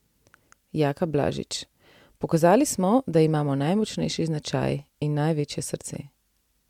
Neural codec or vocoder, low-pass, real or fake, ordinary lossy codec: none; 19.8 kHz; real; MP3, 96 kbps